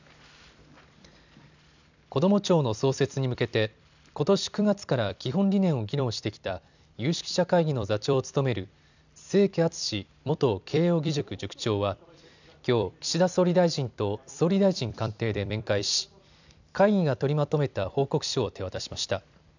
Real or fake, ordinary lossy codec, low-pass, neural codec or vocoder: fake; none; 7.2 kHz; vocoder, 44.1 kHz, 128 mel bands every 256 samples, BigVGAN v2